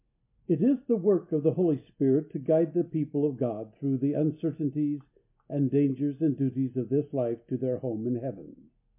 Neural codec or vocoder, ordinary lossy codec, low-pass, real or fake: none; MP3, 32 kbps; 3.6 kHz; real